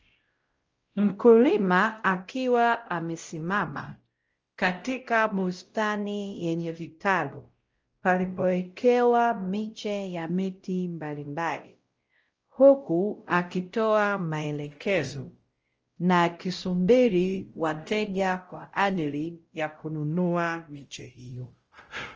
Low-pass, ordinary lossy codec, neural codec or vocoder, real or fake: 7.2 kHz; Opus, 24 kbps; codec, 16 kHz, 0.5 kbps, X-Codec, WavLM features, trained on Multilingual LibriSpeech; fake